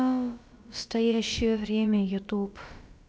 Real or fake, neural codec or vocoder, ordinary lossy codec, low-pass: fake; codec, 16 kHz, about 1 kbps, DyCAST, with the encoder's durations; none; none